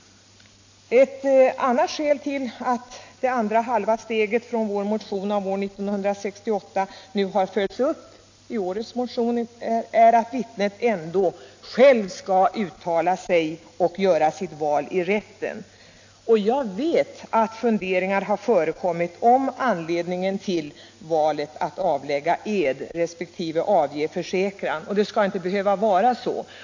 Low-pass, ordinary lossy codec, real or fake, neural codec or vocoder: 7.2 kHz; AAC, 48 kbps; real; none